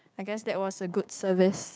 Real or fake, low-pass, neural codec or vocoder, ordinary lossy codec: fake; none; codec, 16 kHz, 6 kbps, DAC; none